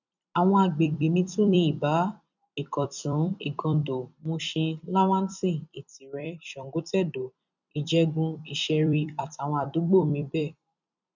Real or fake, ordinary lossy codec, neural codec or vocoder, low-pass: fake; none; vocoder, 44.1 kHz, 128 mel bands every 256 samples, BigVGAN v2; 7.2 kHz